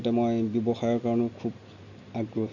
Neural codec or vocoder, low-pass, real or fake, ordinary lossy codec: none; 7.2 kHz; real; none